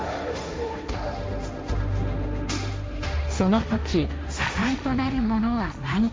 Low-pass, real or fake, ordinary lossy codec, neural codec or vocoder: none; fake; none; codec, 16 kHz, 1.1 kbps, Voila-Tokenizer